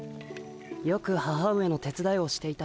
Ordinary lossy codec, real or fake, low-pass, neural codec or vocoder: none; real; none; none